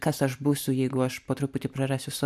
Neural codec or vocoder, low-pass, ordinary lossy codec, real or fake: none; 14.4 kHz; AAC, 96 kbps; real